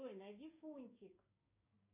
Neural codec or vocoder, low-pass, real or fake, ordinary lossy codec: codec, 16 kHz, 6 kbps, DAC; 3.6 kHz; fake; MP3, 24 kbps